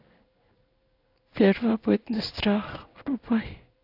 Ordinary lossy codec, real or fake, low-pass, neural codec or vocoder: AAC, 32 kbps; fake; 5.4 kHz; codec, 16 kHz in and 24 kHz out, 1 kbps, XY-Tokenizer